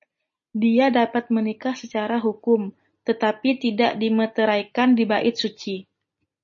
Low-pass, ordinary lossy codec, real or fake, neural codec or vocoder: 7.2 kHz; MP3, 32 kbps; real; none